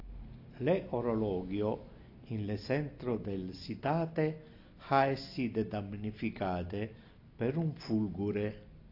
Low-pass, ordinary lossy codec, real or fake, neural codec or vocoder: 5.4 kHz; AAC, 32 kbps; real; none